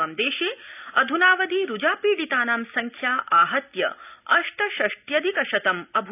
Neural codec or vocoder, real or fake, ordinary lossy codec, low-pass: none; real; none; 3.6 kHz